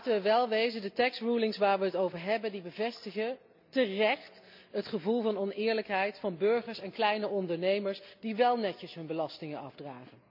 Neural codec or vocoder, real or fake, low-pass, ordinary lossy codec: none; real; 5.4 kHz; MP3, 48 kbps